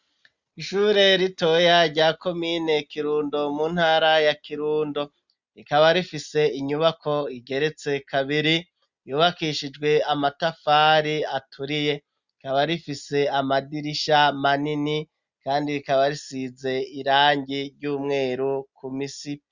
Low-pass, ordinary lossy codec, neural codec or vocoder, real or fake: 7.2 kHz; Opus, 64 kbps; none; real